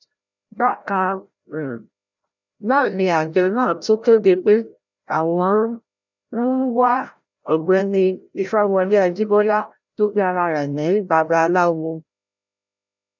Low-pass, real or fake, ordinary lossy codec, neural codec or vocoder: 7.2 kHz; fake; none; codec, 16 kHz, 0.5 kbps, FreqCodec, larger model